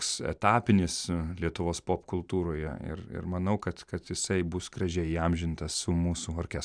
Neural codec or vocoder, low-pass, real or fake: none; 9.9 kHz; real